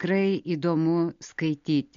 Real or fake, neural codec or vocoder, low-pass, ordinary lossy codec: real; none; 7.2 kHz; MP3, 48 kbps